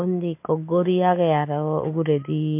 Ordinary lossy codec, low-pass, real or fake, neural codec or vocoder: MP3, 24 kbps; 3.6 kHz; real; none